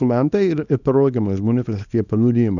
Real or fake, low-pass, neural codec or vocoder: fake; 7.2 kHz; codec, 24 kHz, 0.9 kbps, WavTokenizer, small release